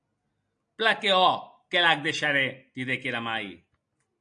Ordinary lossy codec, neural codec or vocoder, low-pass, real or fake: AAC, 64 kbps; none; 9.9 kHz; real